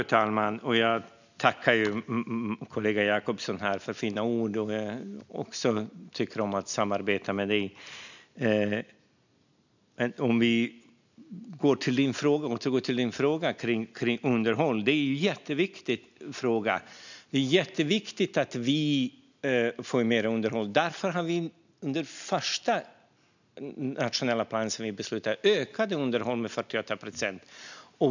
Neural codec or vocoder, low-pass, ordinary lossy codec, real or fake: none; 7.2 kHz; none; real